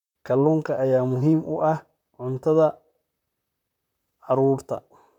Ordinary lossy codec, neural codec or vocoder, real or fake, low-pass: none; vocoder, 44.1 kHz, 128 mel bands, Pupu-Vocoder; fake; 19.8 kHz